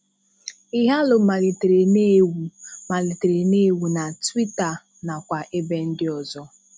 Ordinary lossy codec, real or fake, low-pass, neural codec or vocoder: none; real; none; none